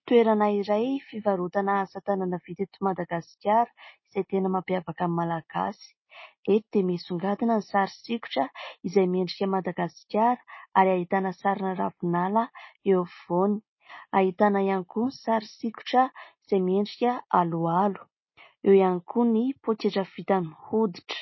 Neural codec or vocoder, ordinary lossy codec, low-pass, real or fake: none; MP3, 24 kbps; 7.2 kHz; real